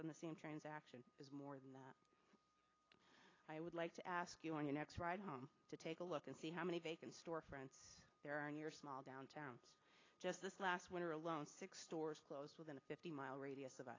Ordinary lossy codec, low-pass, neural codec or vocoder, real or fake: AAC, 32 kbps; 7.2 kHz; none; real